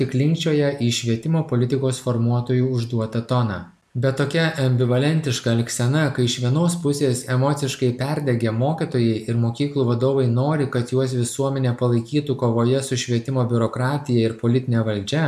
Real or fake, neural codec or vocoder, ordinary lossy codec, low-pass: real; none; MP3, 96 kbps; 14.4 kHz